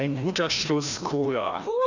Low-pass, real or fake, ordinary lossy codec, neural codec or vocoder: 7.2 kHz; fake; none; codec, 16 kHz, 1 kbps, FreqCodec, larger model